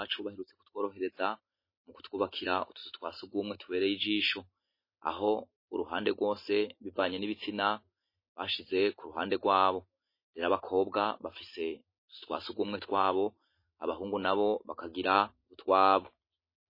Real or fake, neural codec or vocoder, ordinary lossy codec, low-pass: real; none; MP3, 24 kbps; 5.4 kHz